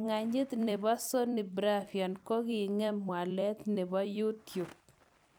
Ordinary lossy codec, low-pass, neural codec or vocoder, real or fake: none; none; vocoder, 44.1 kHz, 128 mel bands every 256 samples, BigVGAN v2; fake